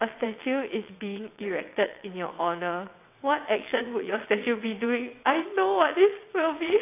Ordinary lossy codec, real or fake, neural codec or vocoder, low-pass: AAC, 24 kbps; fake; vocoder, 22.05 kHz, 80 mel bands, WaveNeXt; 3.6 kHz